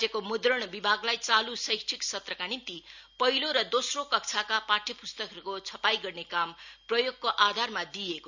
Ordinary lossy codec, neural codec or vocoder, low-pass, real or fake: none; none; 7.2 kHz; real